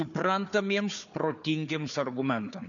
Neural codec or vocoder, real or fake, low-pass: codec, 16 kHz, 4 kbps, FunCodec, trained on Chinese and English, 50 frames a second; fake; 7.2 kHz